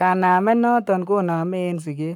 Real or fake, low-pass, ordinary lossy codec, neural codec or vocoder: fake; 19.8 kHz; none; codec, 44.1 kHz, 7.8 kbps, Pupu-Codec